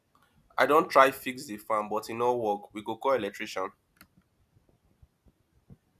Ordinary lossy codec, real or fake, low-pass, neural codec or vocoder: none; real; 14.4 kHz; none